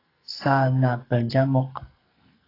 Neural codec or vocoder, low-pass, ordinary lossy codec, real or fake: codec, 44.1 kHz, 2.6 kbps, SNAC; 5.4 kHz; AAC, 24 kbps; fake